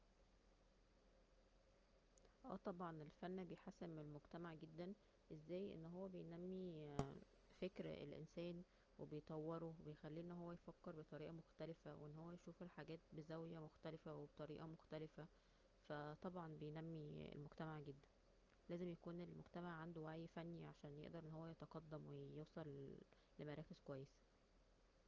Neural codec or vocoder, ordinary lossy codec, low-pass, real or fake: none; Opus, 24 kbps; 7.2 kHz; real